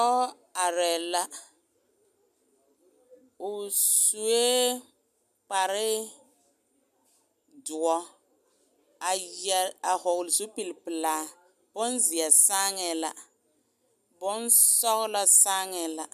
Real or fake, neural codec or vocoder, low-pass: real; none; 14.4 kHz